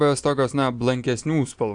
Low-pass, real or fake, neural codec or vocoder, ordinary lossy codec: 9.9 kHz; real; none; AAC, 64 kbps